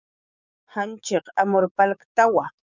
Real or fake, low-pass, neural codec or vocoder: fake; 7.2 kHz; codec, 16 kHz, 6 kbps, DAC